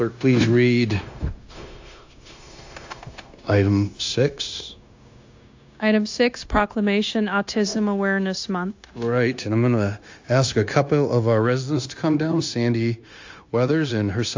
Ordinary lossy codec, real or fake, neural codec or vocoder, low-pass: AAC, 48 kbps; fake; codec, 16 kHz, 0.9 kbps, LongCat-Audio-Codec; 7.2 kHz